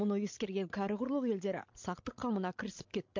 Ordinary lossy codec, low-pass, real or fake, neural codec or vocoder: MP3, 48 kbps; 7.2 kHz; fake; codec, 16 kHz, 16 kbps, FreqCodec, larger model